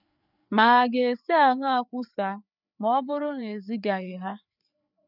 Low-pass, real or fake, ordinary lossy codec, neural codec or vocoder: 5.4 kHz; fake; none; codec, 16 kHz, 4 kbps, FreqCodec, larger model